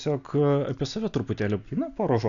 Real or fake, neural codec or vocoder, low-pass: real; none; 7.2 kHz